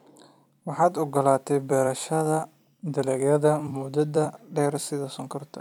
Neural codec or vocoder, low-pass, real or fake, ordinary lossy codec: vocoder, 44.1 kHz, 128 mel bands every 256 samples, BigVGAN v2; 19.8 kHz; fake; none